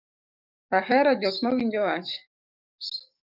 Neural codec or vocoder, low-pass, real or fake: codec, 44.1 kHz, 7.8 kbps, DAC; 5.4 kHz; fake